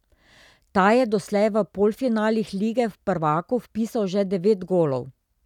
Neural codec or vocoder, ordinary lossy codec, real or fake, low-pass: none; none; real; 19.8 kHz